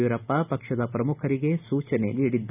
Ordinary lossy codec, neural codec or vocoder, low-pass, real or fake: none; none; 3.6 kHz; real